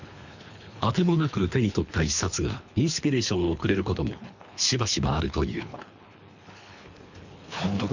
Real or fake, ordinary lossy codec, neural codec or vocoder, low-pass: fake; none; codec, 24 kHz, 3 kbps, HILCodec; 7.2 kHz